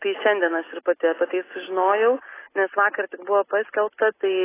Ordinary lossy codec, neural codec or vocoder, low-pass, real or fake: AAC, 16 kbps; none; 3.6 kHz; real